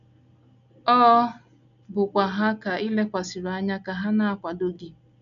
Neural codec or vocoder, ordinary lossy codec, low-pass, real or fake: none; none; 7.2 kHz; real